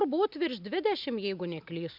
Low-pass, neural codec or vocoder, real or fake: 5.4 kHz; none; real